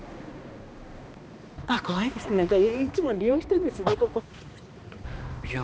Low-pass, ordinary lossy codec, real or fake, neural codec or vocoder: none; none; fake; codec, 16 kHz, 2 kbps, X-Codec, HuBERT features, trained on balanced general audio